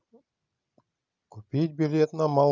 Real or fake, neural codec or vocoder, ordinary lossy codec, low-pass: real; none; none; 7.2 kHz